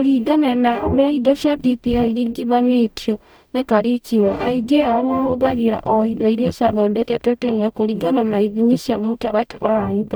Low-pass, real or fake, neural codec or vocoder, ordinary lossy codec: none; fake; codec, 44.1 kHz, 0.9 kbps, DAC; none